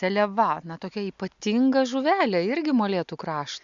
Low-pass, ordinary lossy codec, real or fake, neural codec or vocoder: 7.2 kHz; Opus, 64 kbps; real; none